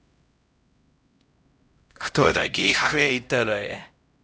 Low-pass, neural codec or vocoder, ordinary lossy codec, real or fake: none; codec, 16 kHz, 0.5 kbps, X-Codec, HuBERT features, trained on LibriSpeech; none; fake